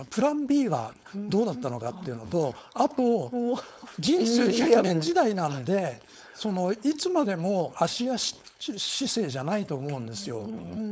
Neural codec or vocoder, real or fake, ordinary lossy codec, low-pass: codec, 16 kHz, 4.8 kbps, FACodec; fake; none; none